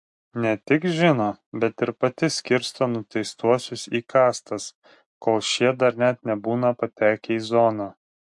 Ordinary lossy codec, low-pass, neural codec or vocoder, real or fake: MP3, 64 kbps; 10.8 kHz; none; real